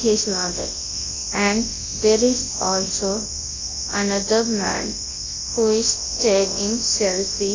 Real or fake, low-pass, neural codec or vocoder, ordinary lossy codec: fake; 7.2 kHz; codec, 24 kHz, 0.9 kbps, WavTokenizer, large speech release; AAC, 32 kbps